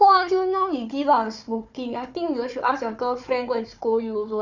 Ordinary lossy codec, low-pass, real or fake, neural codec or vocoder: none; 7.2 kHz; fake; codec, 16 kHz, 4 kbps, FunCodec, trained on Chinese and English, 50 frames a second